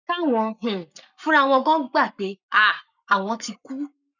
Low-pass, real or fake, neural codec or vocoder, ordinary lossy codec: 7.2 kHz; fake; codec, 44.1 kHz, 7.8 kbps, Pupu-Codec; none